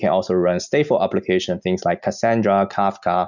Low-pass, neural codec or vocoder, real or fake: 7.2 kHz; none; real